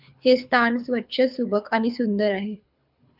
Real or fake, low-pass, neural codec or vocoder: fake; 5.4 kHz; codec, 24 kHz, 6 kbps, HILCodec